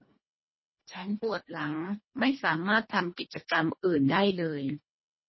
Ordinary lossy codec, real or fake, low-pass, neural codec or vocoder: MP3, 24 kbps; fake; 7.2 kHz; codec, 24 kHz, 1.5 kbps, HILCodec